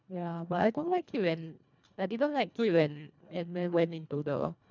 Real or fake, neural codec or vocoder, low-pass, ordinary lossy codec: fake; codec, 24 kHz, 1.5 kbps, HILCodec; 7.2 kHz; none